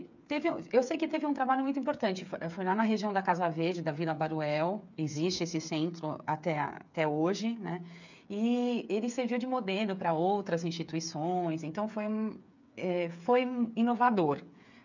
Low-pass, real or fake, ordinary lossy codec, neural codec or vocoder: 7.2 kHz; fake; none; codec, 16 kHz, 8 kbps, FreqCodec, smaller model